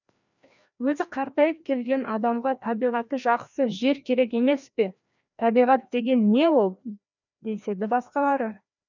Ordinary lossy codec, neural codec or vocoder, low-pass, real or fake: none; codec, 16 kHz, 1 kbps, FreqCodec, larger model; 7.2 kHz; fake